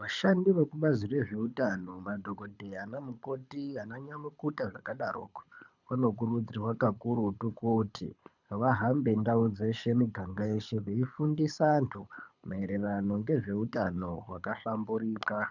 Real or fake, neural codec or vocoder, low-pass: fake; codec, 24 kHz, 6 kbps, HILCodec; 7.2 kHz